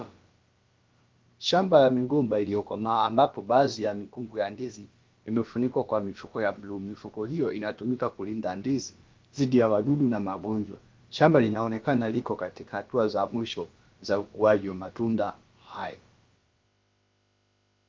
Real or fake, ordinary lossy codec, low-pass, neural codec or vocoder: fake; Opus, 32 kbps; 7.2 kHz; codec, 16 kHz, about 1 kbps, DyCAST, with the encoder's durations